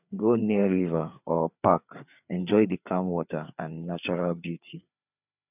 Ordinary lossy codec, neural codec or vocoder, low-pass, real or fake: none; codec, 16 kHz, 4 kbps, FreqCodec, larger model; 3.6 kHz; fake